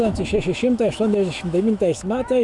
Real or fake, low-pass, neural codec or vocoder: fake; 10.8 kHz; autoencoder, 48 kHz, 128 numbers a frame, DAC-VAE, trained on Japanese speech